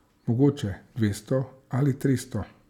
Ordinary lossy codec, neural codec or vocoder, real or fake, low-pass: none; none; real; 19.8 kHz